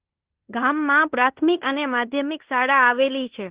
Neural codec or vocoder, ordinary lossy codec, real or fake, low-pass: codec, 16 kHz in and 24 kHz out, 0.9 kbps, LongCat-Audio-Codec, fine tuned four codebook decoder; Opus, 16 kbps; fake; 3.6 kHz